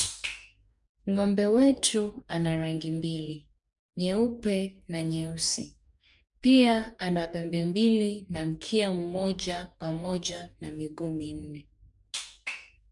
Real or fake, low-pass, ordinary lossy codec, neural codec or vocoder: fake; 10.8 kHz; none; codec, 44.1 kHz, 2.6 kbps, DAC